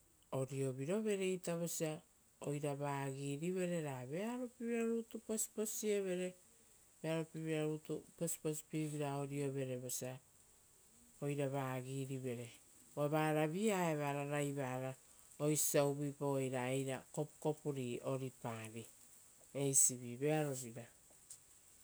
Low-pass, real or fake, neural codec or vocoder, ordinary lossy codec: none; real; none; none